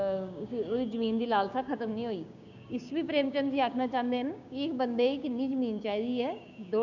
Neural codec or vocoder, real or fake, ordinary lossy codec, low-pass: codec, 16 kHz, 6 kbps, DAC; fake; none; 7.2 kHz